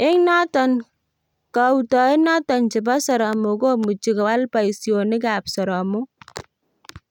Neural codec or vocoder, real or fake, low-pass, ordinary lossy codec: none; real; 19.8 kHz; none